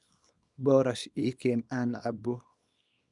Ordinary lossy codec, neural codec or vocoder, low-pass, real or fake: none; codec, 24 kHz, 0.9 kbps, WavTokenizer, small release; 10.8 kHz; fake